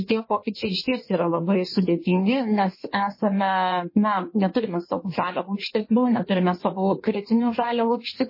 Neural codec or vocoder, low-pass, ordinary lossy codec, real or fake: codec, 16 kHz in and 24 kHz out, 1.1 kbps, FireRedTTS-2 codec; 5.4 kHz; MP3, 24 kbps; fake